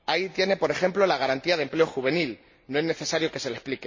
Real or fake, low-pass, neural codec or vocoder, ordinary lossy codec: real; 7.2 kHz; none; MP3, 32 kbps